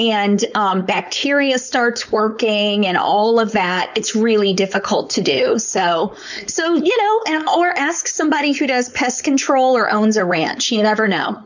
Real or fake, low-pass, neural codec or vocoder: fake; 7.2 kHz; codec, 16 kHz, 4.8 kbps, FACodec